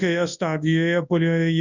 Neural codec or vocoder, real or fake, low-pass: codec, 24 kHz, 0.9 kbps, WavTokenizer, large speech release; fake; 7.2 kHz